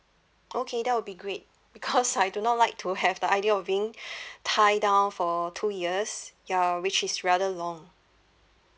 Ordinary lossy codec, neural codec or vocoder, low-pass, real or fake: none; none; none; real